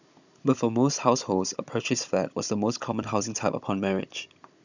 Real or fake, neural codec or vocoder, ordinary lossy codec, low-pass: fake; codec, 16 kHz, 16 kbps, FunCodec, trained on Chinese and English, 50 frames a second; none; 7.2 kHz